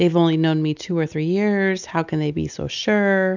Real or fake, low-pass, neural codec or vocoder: real; 7.2 kHz; none